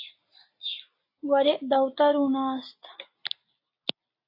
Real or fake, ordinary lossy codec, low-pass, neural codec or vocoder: real; AAC, 32 kbps; 5.4 kHz; none